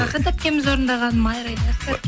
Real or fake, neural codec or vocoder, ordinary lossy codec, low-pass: real; none; none; none